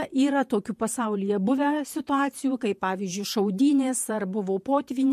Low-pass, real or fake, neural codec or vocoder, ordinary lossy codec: 14.4 kHz; fake; vocoder, 48 kHz, 128 mel bands, Vocos; MP3, 64 kbps